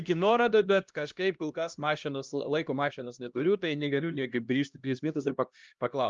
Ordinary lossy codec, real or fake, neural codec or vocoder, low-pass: Opus, 32 kbps; fake; codec, 16 kHz, 1 kbps, X-Codec, HuBERT features, trained on LibriSpeech; 7.2 kHz